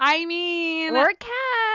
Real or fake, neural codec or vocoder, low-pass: real; none; 7.2 kHz